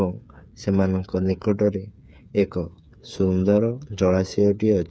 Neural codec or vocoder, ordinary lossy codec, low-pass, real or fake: codec, 16 kHz, 8 kbps, FreqCodec, smaller model; none; none; fake